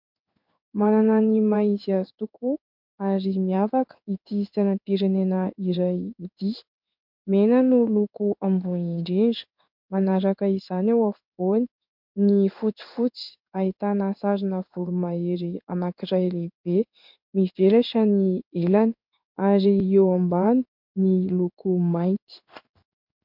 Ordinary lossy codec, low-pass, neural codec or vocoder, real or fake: MP3, 48 kbps; 5.4 kHz; codec, 16 kHz in and 24 kHz out, 1 kbps, XY-Tokenizer; fake